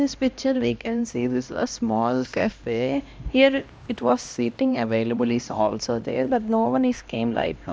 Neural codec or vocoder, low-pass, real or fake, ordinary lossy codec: codec, 16 kHz, 1 kbps, X-Codec, HuBERT features, trained on LibriSpeech; none; fake; none